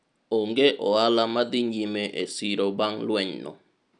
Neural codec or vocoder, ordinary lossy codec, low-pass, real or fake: none; none; 9.9 kHz; real